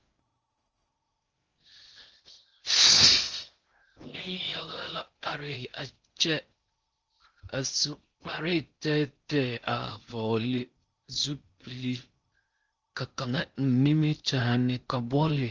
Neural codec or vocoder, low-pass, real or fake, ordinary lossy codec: codec, 16 kHz in and 24 kHz out, 0.6 kbps, FocalCodec, streaming, 4096 codes; 7.2 kHz; fake; Opus, 24 kbps